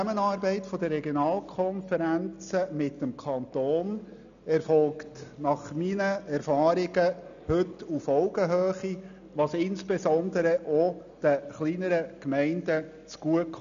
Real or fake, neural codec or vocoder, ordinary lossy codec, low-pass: real; none; none; 7.2 kHz